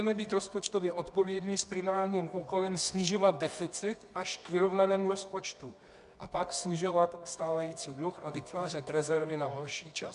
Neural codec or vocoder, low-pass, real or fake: codec, 24 kHz, 0.9 kbps, WavTokenizer, medium music audio release; 10.8 kHz; fake